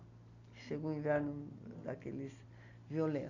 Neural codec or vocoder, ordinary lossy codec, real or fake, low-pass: none; none; real; 7.2 kHz